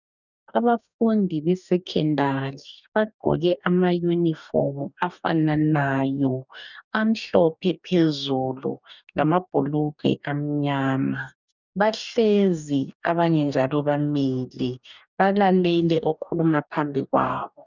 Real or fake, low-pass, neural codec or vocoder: fake; 7.2 kHz; codec, 44.1 kHz, 2.6 kbps, DAC